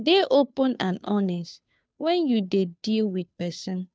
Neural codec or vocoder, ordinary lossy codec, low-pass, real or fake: codec, 16 kHz, 2 kbps, FunCodec, trained on Chinese and English, 25 frames a second; none; none; fake